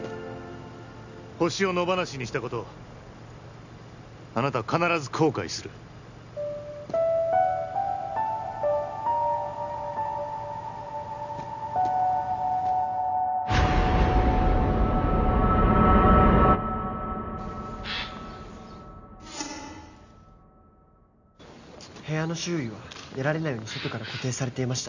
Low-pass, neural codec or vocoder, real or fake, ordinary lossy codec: 7.2 kHz; none; real; none